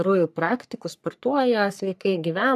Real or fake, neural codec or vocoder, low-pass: fake; codec, 44.1 kHz, 3.4 kbps, Pupu-Codec; 14.4 kHz